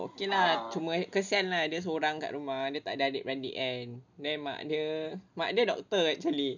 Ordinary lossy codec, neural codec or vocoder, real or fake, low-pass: none; none; real; 7.2 kHz